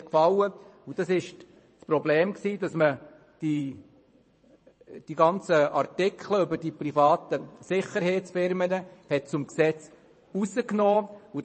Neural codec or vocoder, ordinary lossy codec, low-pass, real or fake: vocoder, 22.05 kHz, 80 mel bands, WaveNeXt; MP3, 32 kbps; 9.9 kHz; fake